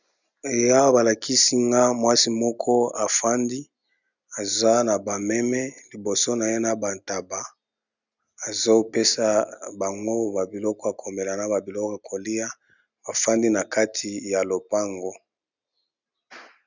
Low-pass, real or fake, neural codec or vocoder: 7.2 kHz; real; none